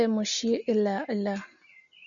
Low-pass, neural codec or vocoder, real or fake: 7.2 kHz; none; real